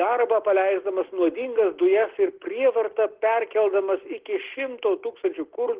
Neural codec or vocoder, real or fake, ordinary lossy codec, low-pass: none; real; Opus, 16 kbps; 3.6 kHz